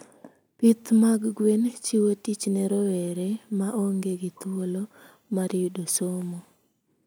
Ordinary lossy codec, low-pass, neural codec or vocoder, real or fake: none; none; none; real